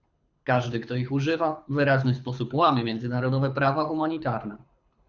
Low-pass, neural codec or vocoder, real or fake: 7.2 kHz; codec, 24 kHz, 6 kbps, HILCodec; fake